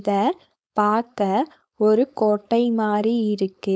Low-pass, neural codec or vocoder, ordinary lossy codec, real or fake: none; codec, 16 kHz, 4.8 kbps, FACodec; none; fake